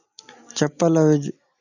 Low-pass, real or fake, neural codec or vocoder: 7.2 kHz; real; none